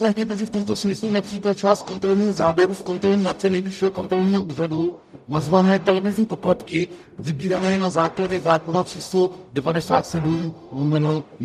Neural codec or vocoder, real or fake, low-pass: codec, 44.1 kHz, 0.9 kbps, DAC; fake; 14.4 kHz